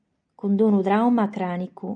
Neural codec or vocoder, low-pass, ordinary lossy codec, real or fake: none; 9.9 kHz; MP3, 96 kbps; real